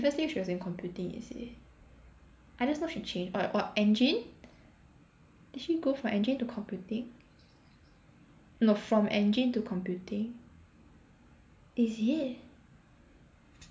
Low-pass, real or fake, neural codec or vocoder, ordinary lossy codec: none; real; none; none